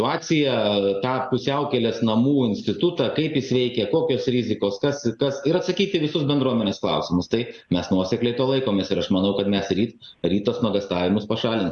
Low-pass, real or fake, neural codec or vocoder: 10.8 kHz; real; none